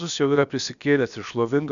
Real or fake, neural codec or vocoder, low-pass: fake; codec, 16 kHz, about 1 kbps, DyCAST, with the encoder's durations; 7.2 kHz